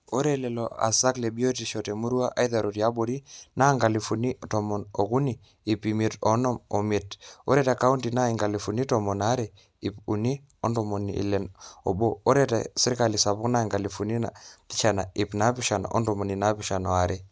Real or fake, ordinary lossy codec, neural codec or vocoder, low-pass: real; none; none; none